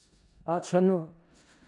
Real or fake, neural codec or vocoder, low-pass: fake; codec, 16 kHz in and 24 kHz out, 0.4 kbps, LongCat-Audio-Codec, four codebook decoder; 10.8 kHz